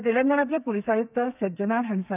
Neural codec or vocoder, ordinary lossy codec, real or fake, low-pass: codec, 32 kHz, 1.9 kbps, SNAC; none; fake; 3.6 kHz